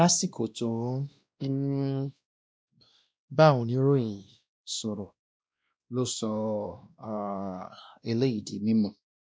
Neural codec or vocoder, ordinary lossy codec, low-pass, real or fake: codec, 16 kHz, 1 kbps, X-Codec, WavLM features, trained on Multilingual LibriSpeech; none; none; fake